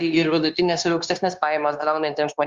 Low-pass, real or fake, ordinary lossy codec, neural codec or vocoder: 7.2 kHz; fake; Opus, 32 kbps; codec, 16 kHz, 0.9 kbps, LongCat-Audio-Codec